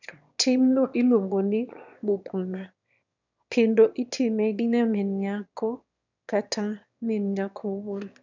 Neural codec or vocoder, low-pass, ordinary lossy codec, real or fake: autoencoder, 22.05 kHz, a latent of 192 numbers a frame, VITS, trained on one speaker; 7.2 kHz; none; fake